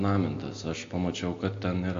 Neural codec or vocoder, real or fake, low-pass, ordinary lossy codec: none; real; 7.2 kHz; AAC, 48 kbps